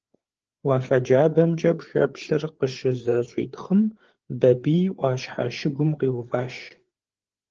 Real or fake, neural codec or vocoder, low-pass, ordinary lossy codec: fake; codec, 16 kHz, 4 kbps, FreqCodec, larger model; 7.2 kHz; Opus, 16 kbps